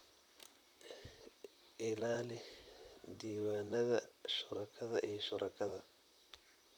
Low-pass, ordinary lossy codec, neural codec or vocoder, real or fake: 19.8 kHz; none; vocoder, 44.1 kHz, 128 mel bands, Pupu-Vocoder; fake